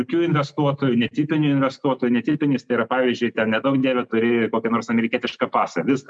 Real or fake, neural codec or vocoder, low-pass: real; none; 9.9 kHz